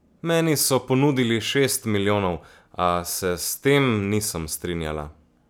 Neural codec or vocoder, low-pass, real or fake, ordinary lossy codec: none; none; real; none